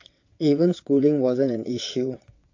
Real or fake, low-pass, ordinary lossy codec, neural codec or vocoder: fake; 7.2 kHz; AAC, 48 kbps; vocoder, 22.05 kHz, 80 mel bands, WaveNeXt